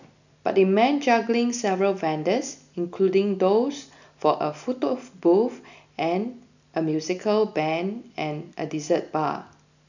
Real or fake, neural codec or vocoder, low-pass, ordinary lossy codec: real; none; 7.2 kHz; none